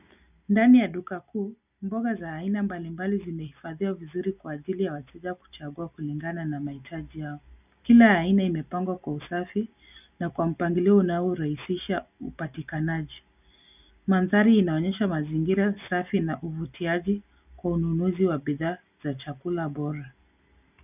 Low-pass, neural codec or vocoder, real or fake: 3.6 kHz; none; real